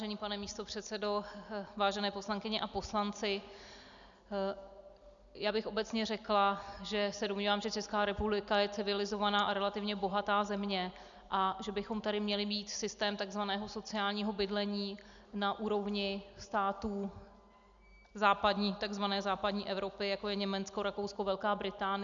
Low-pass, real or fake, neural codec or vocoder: 7.2 kHz; real; none